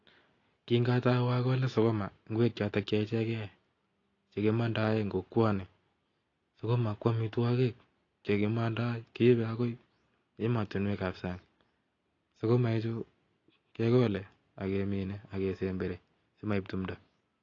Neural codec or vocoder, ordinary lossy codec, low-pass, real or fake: none; AAC, 32 kbps; 7.2 kHz; real